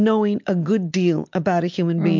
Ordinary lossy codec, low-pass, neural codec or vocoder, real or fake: MP3, 64 kbps; 7.2 kHz; none; real